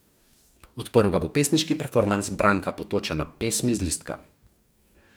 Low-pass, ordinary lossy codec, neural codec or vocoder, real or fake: none; none; codec, 44.1 kHz, 2.6 kbps, DAC; fake